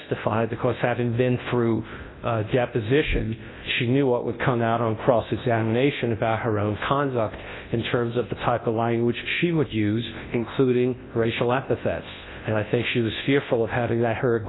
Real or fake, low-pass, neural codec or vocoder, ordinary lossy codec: fake; 7.2 kHz; codec, 24 kHz, 0.9 kbps, WavTokenizer, large speech release; AAC, 16 kbps